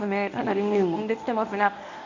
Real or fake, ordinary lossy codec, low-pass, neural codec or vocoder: fake; none; 7.2 kHz; codec, 24 kHz, 0.9 kbps, WavTokenizer, medium speech release version 1